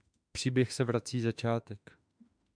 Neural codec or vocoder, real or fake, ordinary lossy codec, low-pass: autoencoder, 48 kHz, 32 numbers a frame, DAC-VAE, trained on Japanese speech; fake; MP3, 96 kbps; 9.9 kHz